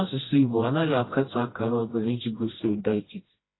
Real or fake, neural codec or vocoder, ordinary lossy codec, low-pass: fake; codec, 16 kHz, 1 kbps, FreqCodec, smaller model; AAC, 16 kbps; 7.2 kHz